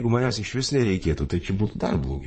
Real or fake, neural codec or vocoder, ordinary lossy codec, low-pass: fake; vocoder, 22.05 kHz, 80 mel bands, Vocos; MP3, 32 kbps; 9.9 kHz